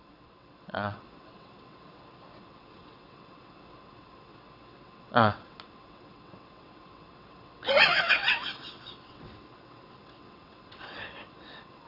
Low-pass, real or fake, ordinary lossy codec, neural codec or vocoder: 5.4 kHz; fake; none; vocoder, 22.05 kHz, 80 mel bands, WaveNeXt